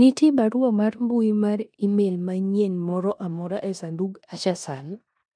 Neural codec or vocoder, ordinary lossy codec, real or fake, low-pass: codec, 16 kHz in and 24 kHz out, 0.9 kbps, LongCat-Audio-Codec, four codebook decoder; none; fake; 9.9 kHz